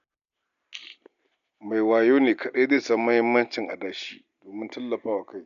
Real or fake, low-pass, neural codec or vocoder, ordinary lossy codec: real; 7.2 kHz; none; none